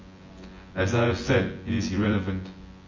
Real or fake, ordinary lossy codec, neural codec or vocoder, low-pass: fake; MP3, 32 kbps; vocoder, 24 kHz, 100 mel bands, Vocos; 7.2 kHz